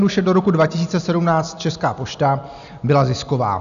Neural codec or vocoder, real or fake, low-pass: none; real; 7.2 kHz